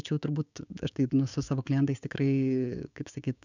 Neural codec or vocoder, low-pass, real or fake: codec, 16 kHz, 6 kbps, DAC; 7.2 kHz; fake